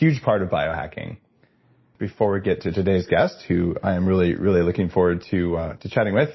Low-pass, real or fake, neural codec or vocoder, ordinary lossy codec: 7.2 kHz; real; none; MP3, 24 kbps